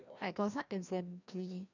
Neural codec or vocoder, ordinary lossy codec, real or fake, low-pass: codec, 16 kHz, 1 kbps, FreqCodec, larger model; none; fake; 7.2 kHz